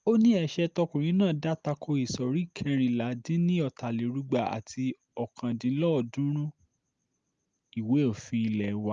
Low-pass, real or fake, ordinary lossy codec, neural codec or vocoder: 7.2 kHz; real; Opus, 24 kbps; none